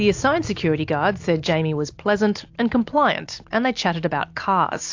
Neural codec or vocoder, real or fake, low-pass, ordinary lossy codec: none; real; 7.2 kHz; AAC, 48 kbps